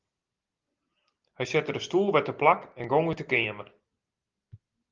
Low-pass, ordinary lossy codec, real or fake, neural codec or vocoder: 7.2 kHz; Opus, 32 kbps; real; none